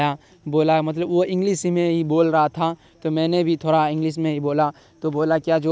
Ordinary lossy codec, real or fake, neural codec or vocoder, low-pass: none; real; none; none